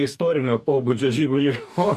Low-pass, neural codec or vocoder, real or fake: 14.4 kHz; codec, 44.1 kHz, 2.6 kbps, DAC; fake